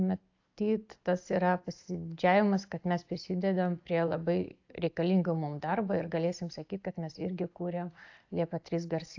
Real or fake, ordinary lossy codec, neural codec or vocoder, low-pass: real; AAC, 48 kbps; none; 7.2 kHz